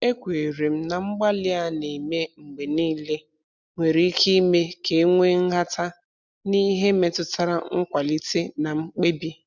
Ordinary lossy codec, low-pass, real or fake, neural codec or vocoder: none; 7.2 kHz; real; none